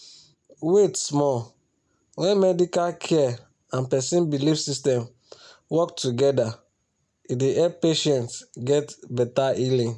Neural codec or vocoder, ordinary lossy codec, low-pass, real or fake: none; none; none; real